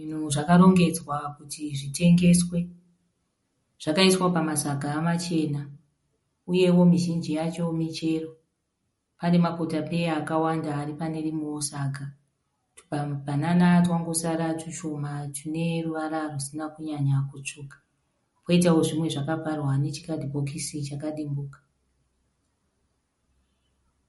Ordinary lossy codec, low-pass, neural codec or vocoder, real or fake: MP3, 48 kbps; 19.8 kHz; none; real